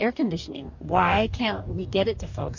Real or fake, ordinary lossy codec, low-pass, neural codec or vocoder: fake; AAC, 48 kbps; 7.2 kHz; codec, 44.1 kHz, 2.6 kbps, DAC